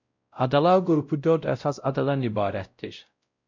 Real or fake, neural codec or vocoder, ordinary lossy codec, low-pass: fake; codec, 16 kHz, 0.5 kbps, X-Codec, WavLM features, trained on Multilingual LibriSpeech; MP3, 64 kbps; 7.2 kHz